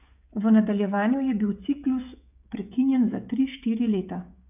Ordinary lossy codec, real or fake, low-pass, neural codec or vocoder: none; fake; 3.6 kHz; codec, 16 kHz, 16 kbps, FreqCodec, smaller model